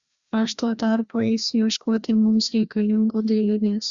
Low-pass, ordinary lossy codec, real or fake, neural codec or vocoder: 7.2 kHz; Opus, 64 kbps; fake; codec, 16 kHz, 1 kbps, FreqCodec, larger model